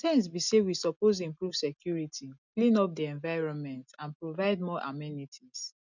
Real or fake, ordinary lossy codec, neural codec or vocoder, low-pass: real; none; none; 7.2 kHz